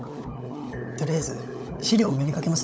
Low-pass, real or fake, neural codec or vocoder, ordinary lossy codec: none; fake; codec, 16 kHz, 16 kbps, FunCodec, trained on LibriTTS, 50 frames a second; none